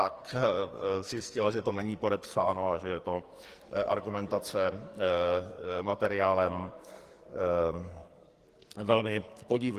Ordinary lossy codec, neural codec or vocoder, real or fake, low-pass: Opus, 24 kbps; codec, 44.1 kHz, 2.6 kbps, SNAC; fake; 14.4 kHz